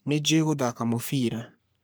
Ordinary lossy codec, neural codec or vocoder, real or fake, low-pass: none; codec, 44.1 kHz, 3.4 kbps, Pupu-Codec; fake; none